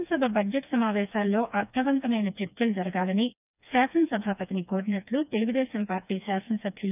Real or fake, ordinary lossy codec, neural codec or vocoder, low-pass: fake; none; codec, 16 kHz, 2 kbps, FreqCodec, smaller model; 3.6 kHz